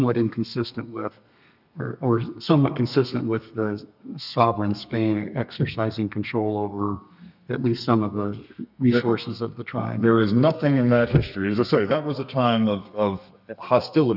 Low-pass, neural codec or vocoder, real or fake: 5.4 kHz; codec, 32 kHz, 1.9 kbps, SNAC; fake